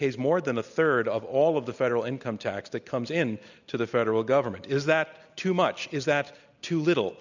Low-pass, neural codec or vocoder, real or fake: 7.2 kHz; none; real